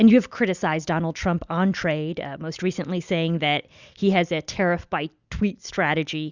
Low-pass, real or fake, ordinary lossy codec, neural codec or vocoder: 7.2 kHz; real; Opus, 64 kbps; none